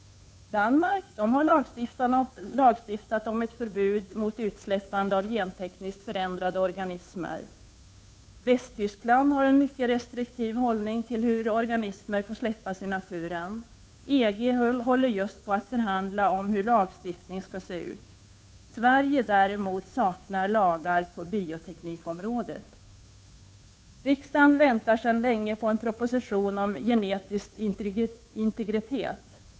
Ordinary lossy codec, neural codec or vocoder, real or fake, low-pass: none; codec, 16 kHz, 2 kbps, FunCodec, trained on Chinese and English, 25 frames a second; fake; none